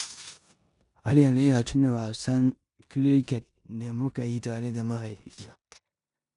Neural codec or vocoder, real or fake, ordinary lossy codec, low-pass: codec, 16 kHz in and 24 kHz out, 0.9 kbps, LongCat-Audio-Codec, four codebook decoder; fake; none; 10.8 kHz